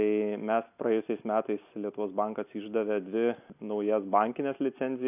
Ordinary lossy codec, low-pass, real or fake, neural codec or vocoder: AAC, 32 kbps; 3.6 kHz; real; none